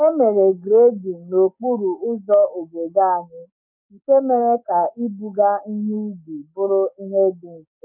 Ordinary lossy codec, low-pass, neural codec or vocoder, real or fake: none; 3.6 kHz; codec, 44.1 kHz, 7.8 kbps, DAC; fake